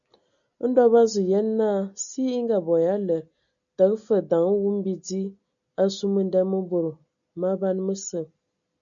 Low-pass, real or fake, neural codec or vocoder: 7.2 kHz; real; none